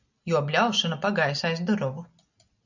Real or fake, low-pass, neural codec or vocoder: real; 7.2 kHz; none